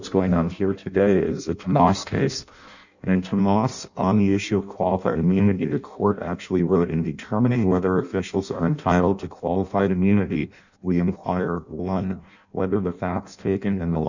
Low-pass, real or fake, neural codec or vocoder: 7.2 kHz; fake; codec, 16 kHz in and 24 kHz out, 0.6 kbps, FireRedTTS-2 codec